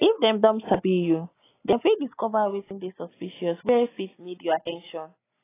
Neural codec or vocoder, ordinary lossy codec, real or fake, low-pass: none; AAC, 16 kbps; real; 3.6 kHz